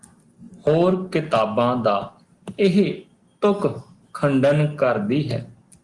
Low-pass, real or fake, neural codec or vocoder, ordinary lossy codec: 10.8 kHz; real; none; Opus, 24 kbps